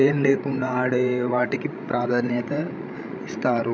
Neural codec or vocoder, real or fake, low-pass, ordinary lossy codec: codec, 16 kHz, 16 kbps, FreqCodec, larger model; fake; none; none